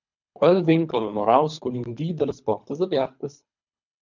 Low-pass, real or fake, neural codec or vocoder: 7.2 kHz; fake; codec, 24 kHz, 3 kbps, HILCodec